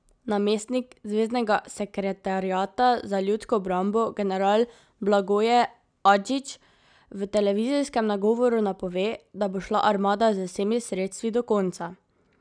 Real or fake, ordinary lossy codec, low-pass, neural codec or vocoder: real; none; 9.9 kHz; none